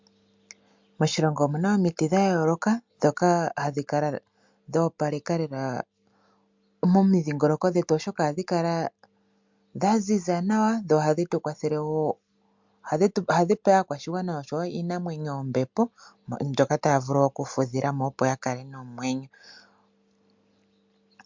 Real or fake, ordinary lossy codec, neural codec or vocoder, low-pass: real; MP3, 64 kbps; none; 7.2 kHz